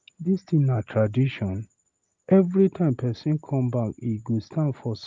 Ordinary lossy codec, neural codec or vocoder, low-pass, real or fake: Opus, 32 kbps; none; 7.2 kHz; real